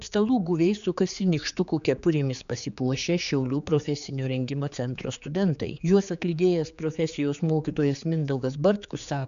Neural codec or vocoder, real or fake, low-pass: codec, 16 kHz, 4 kbps, X-Codec, HuBERT features, trained on general audio; fake; 7.2 kHz